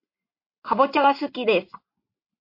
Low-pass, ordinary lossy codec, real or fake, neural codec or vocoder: 5.4 kHz; MP3, 32 kbps; real; none